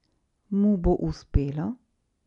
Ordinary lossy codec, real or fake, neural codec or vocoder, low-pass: none; real; none; 10.8 kHz